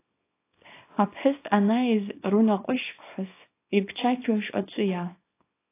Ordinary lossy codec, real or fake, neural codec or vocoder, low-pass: AAC, 24 kbps; fake; codec, 24 kHz, 0.9 kbps, WavTokenizer, small release; 3.6 kHz